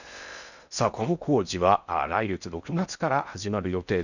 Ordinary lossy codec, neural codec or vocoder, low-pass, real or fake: none; codec, 16 kHz in and 24 kHz out, 0.6 kbps, FocalCodec, streaming, 4096 codes; 7.2 kHz; fake